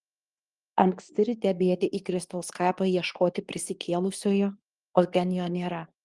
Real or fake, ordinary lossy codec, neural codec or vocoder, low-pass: fake; Opus, 24 kbps; codec, 24 kHz, 0.9 kbps, WavTokenizer, medium speech release version 2; 10.8 kHz